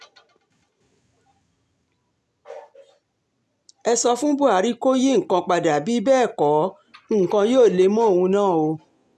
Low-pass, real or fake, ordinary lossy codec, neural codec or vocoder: none; real; none; none